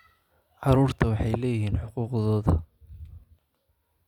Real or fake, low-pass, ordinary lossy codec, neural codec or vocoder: real; 19.8 kHz; none; none